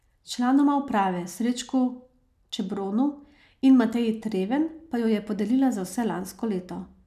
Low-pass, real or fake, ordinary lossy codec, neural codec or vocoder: 14.4 kHz; real; none; none